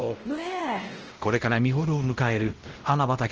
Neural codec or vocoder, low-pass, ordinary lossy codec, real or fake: codec, 16 kHz, 0.5 kbps, X-Codec, WavLM features, trained on Multilingual LibriSpeech; 7.2 kHz; Opus, 16 kbps; fake